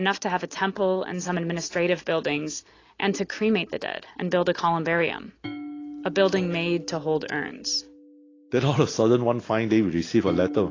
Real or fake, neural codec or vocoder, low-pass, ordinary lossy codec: real; none; 7.2 kHz; AAC, 32 kbps